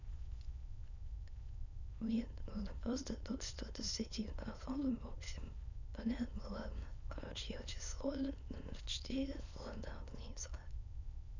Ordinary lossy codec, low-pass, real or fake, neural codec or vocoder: none; 7.2 kHz; fake; autoencoder, 22.05 kHz, a latent of 192 numbers a frame, VITS, trained on many speakers